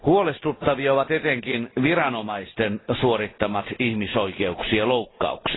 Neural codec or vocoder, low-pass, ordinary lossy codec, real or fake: none; 7.2 kHz; AAC, 16 kbps; real